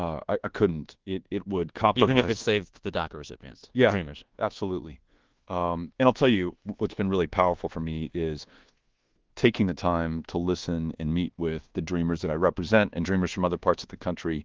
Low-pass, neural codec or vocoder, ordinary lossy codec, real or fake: 7.2 kHz; codec, 24 kHz, 1.2 kbps, DualCodec; Opus, 16 kbps; fake